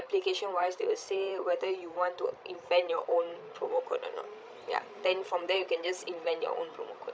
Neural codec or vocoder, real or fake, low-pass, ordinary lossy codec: codec, 16 kHz, 16 kbps, FreqCodec, larger model; fake; none; none